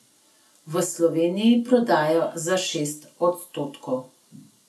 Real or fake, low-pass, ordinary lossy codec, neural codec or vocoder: real; none; none; none